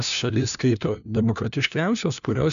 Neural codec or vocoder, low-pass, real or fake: codec, 16 kHz, 1 kbps, FunCodec, trained on LibriTTS, 50 frames a second; 7.2 kHz; fake